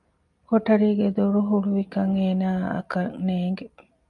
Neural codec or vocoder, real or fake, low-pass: none; real; 10.8 kHz